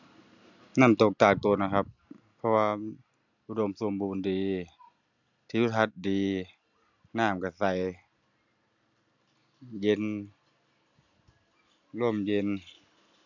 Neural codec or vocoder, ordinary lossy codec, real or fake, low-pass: none; none; real; 7.2 kHz